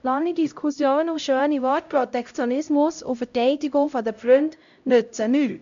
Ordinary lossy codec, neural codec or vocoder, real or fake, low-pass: MP3, 64 kbps; codec, 16 kHz, 0.5 kbps, X-Codec, HuBERT features, trained on LibriSpeech; fake; 7.2 kHz